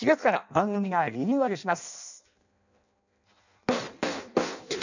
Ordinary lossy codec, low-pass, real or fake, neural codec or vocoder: none; 7.2 kHz; fake; codec, 16 kHz in and 24 kHz out, 0.6 kbps, FireRedTTS-2 codec